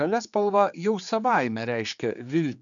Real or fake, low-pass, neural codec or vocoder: fake; 7.2 kHz; codec, 16 kHz, 4 kbps, X-Codec, HuBERT features, trained on general audio